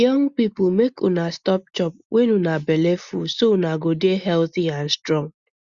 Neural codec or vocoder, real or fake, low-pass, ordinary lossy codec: none; real; 7.2 kHz; Opus, 64 kbps